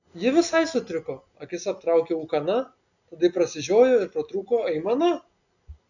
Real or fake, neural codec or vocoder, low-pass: real; none; 7.2 kHz